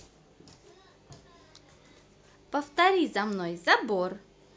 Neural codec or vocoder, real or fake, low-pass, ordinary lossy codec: none; real; none; none